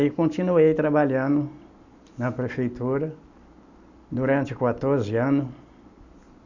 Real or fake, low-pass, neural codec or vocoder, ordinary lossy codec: real; 7.2 kHz; none; none